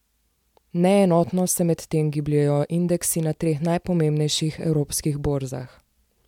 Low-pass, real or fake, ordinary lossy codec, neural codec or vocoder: 19.8 kHz; real; MP3, 96 kbps; none